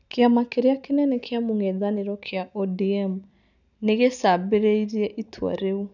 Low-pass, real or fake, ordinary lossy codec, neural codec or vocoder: 7.2 kHz; real; none; none